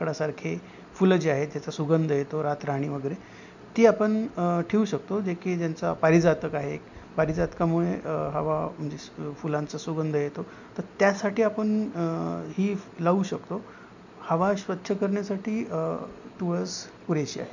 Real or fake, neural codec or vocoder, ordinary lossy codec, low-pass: real; none; none; 7.2 kHz